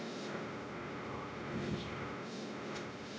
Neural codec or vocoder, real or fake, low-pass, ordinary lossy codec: codec, 16 kHz, 0.5 kbps, X-Codec, WavLM features, trained on Multilingual LibriSpeech; fake; none; none